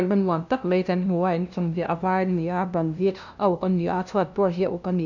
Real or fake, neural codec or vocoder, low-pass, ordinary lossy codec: fake; codec, 16 kHz, 0.5 kbps, FunCodec, trained on LibriTTS, 25 frames a second; 7.2 kHz; none